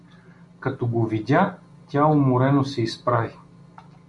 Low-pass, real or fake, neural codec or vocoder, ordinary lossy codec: 10.8 kHz; real; none; MP3, 96 kbps